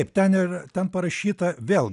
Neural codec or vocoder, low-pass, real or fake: none; 10.8 kHz; real